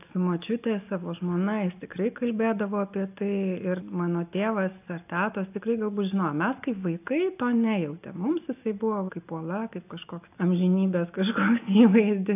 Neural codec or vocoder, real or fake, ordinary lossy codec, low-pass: none; real; AAC, 32 kbps; 3.6 kHz